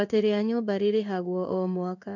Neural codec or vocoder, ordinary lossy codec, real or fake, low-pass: codec, 16 kHz in and 24 kHz out, 1 kbps, XY-Tokenizer; none; fake; 7.2 kHz